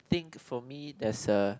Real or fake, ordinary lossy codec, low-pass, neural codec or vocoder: real; none; none; none